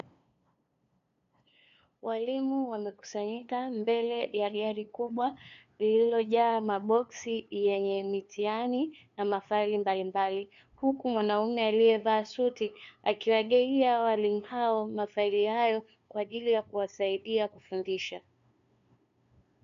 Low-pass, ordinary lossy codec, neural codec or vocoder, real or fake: 7.2 kHz; AAC, 64 kbps; codec, 16 kHz, 2 kbps, FunCodec, trained on LibriTTS, 25 frames a second; fake